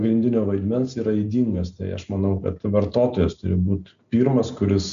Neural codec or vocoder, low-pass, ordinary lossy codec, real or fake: none; 7.2 kHz; AAC, 96 kbps; real